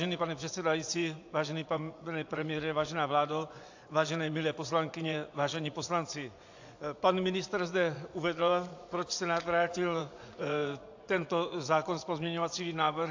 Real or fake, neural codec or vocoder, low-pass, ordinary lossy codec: fake; vocoder, 24 kHz, 100 mel bands, Vocos; 7.2 kHz; AAC, 48 kbps